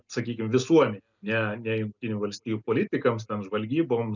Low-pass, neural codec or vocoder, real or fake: 7.2 kHz; none; real